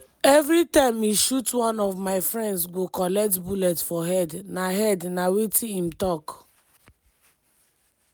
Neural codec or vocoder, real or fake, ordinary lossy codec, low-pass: none; real; none; none